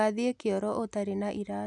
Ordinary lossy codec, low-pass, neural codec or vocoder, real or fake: none; 10.8 kHz; none; real